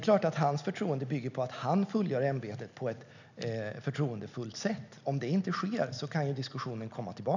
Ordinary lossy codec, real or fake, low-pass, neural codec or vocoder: none; real; 7.2 kHz; none